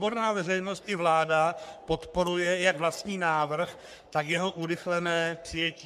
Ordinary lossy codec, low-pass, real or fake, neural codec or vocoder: MP3, 96 kbps; 14.4 kHz; fake; codec, 44.1 kHz, 3.4 kbps, Pupu-Codec